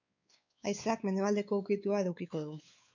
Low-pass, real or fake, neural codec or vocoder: 7.2 kHz; fake; codec, 16 kHz, 4 kbps, X-Codec, WavLM features, trained on Multilingual LibriSpeech